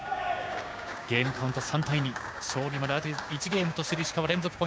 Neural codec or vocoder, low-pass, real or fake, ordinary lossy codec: codec, 16 kHz, 6 kbps, DAC; none; fake; none